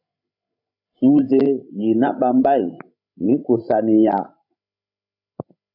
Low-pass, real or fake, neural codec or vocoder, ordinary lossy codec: 5.4 kHz; fake; codec, 16 kHz, 16 kbps, FreqCodec, larger model; AAC, 48 kbps